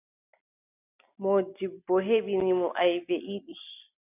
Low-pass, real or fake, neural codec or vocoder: 3.6 kHz; real; none